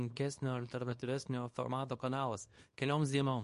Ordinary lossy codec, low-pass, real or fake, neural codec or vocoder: MP3, 48 kbps; 10.8 kHz; fake; codec, 24 kHz, 0.9 kbps, WavTokenizer, small release